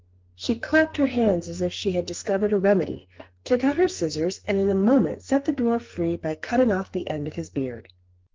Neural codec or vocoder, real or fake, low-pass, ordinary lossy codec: codec, 44.1 kHz, 2.6 kbps, SNAC; fake; 7.2 kHz; Opus, 24 kbps